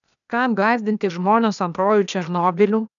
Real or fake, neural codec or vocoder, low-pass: fake; codec, 16 kHz, 0.8 kbps, ZipCodec; 7.2 kHz